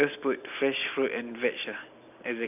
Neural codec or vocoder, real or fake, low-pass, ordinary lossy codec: none; real; 3.6 kHz; none